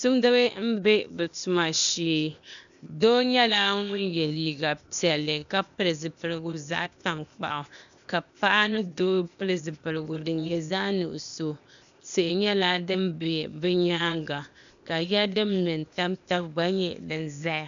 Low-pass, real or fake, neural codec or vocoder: 7.2 kHz; fake; codec, 16 kHz, 0.8 kbps, ZipCodec